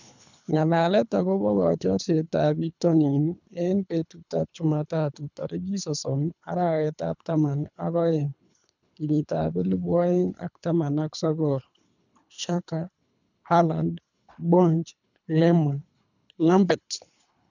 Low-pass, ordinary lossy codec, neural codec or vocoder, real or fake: 7.2 kHz; none; codec, 24 kHz, 3 kbps, HILCodec; fake